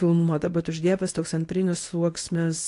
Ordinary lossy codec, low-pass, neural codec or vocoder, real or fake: AAC, 48 kbps; 10.8 kHz; codec, 24 kHz, 0.9 kbps, WavTokenizer, medium speech release version 1; fake